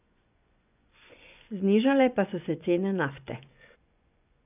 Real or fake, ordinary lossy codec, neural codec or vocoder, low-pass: fake; none; vocoder, 24 kHz, 100 mel bands, Vocos; 3.6 kHz